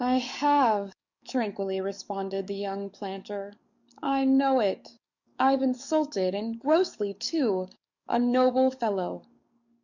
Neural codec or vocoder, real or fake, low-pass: codec, 44.1 kHz, 7.8 kbps, DAC; fake; 7.2 kHz